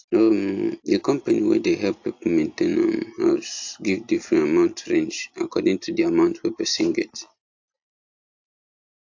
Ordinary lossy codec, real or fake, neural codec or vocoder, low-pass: AAC, 48 kbps; real; none; 7.2 kHz